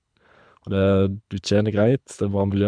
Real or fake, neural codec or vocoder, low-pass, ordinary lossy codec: fake; codec, 24 kHz, 6 kbps, HILCodec; 9.9 kHz; MP3, 96 kbps